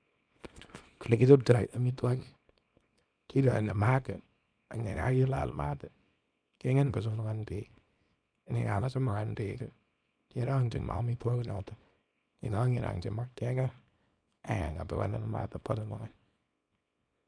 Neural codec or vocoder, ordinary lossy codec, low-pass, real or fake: codec, 24 kHz, 0.9 kbps, WavTokenizer, small release; none; 9.9 kHz; fake